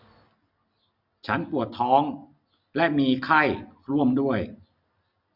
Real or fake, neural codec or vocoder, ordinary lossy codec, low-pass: real; none; none; 5.4 kHz